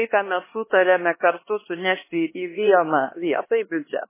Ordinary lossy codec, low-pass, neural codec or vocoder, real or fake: MP3, 16 kbps; 3.6 kHz; codec, 16 kHz, 2 kbps, X-Codec, HuBERT features, trained on LibriSpeech; fake